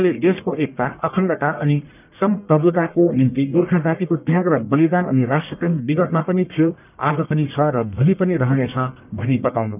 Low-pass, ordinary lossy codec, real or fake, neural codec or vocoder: 3.6 kHz; none; fake; codec, 44.1 kHz, 1.7 kbps, Pupu-Codec